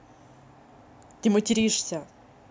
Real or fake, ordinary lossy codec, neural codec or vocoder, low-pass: real; none; none; none